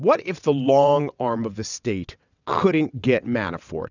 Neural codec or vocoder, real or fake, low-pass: vocoder, 22.05 kHz, 80 mel bands, WaveNeXt; fake; 7.2 kHz